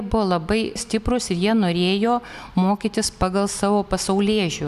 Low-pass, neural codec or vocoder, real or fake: 14.4 kHz; none; real